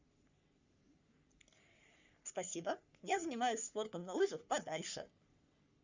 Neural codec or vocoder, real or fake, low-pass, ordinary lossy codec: codec, 44.1 kHz, 3.4 kbps, Pupu-Codec; fake; 7.2 kHz; Opus, 64 kbps